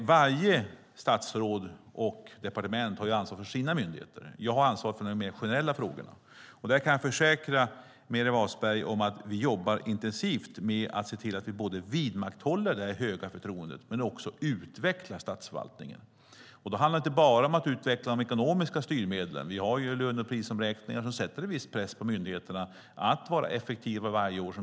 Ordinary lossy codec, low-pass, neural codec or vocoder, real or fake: none; none; none; real